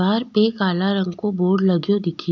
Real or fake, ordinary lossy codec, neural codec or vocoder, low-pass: real; none; none; 7.2 kHz